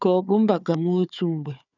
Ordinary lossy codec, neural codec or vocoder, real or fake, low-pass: none; codec, 16 kHz, 4 kbps, FunCodec, trained on Chinese and English, 50 frames a second; fake; 7.2 kHz